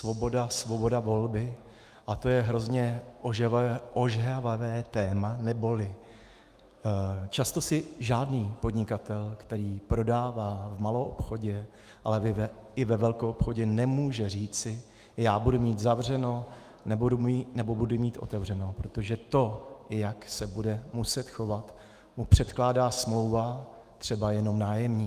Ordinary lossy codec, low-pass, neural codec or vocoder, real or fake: Opus, 32 kbps; 14.4 kHz; autoencoder, 48 kHz, 128 numbers a frame, DAC-VAE, trained on Japanese speech; fake